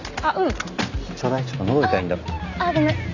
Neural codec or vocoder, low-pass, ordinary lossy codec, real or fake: none; 7.2 kHz; none; real